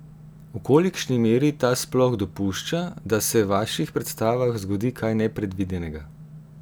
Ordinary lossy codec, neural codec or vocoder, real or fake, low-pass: none; none; real; none